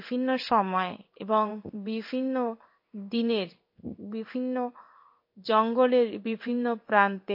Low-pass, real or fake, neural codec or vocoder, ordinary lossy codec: 5.4 kHz; fake; codec, 16 kHz in and 24 kHz out, 1 kbps, XY-Tokenizer; MP3, 32 kbps